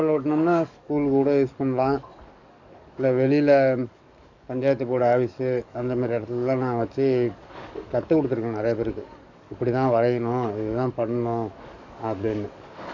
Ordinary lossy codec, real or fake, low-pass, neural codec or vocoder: none; fake; 7.2 kHz; codec, 44.1 kHz, 7.8 kbps, DAC